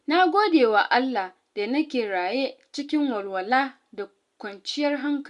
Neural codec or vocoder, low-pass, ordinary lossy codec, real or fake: none; 10.8 kHz; Opus, 64 kbps; real